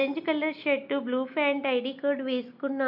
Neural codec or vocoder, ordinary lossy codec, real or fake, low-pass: none; none; real; 5.4 kHz